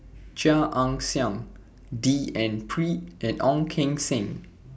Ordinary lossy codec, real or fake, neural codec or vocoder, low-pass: none; real; none; none